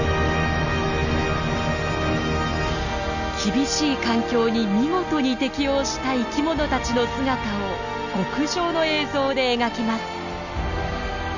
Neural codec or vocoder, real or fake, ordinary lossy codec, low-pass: none; real; none; 7.2 kHz